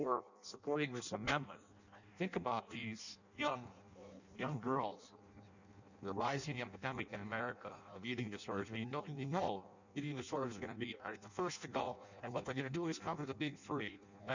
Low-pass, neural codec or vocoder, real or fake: 7.2 kHz; codec, 16 kHz in and 24 kHz out, 0.6 kbps, FireRedTTS-2 codec; fake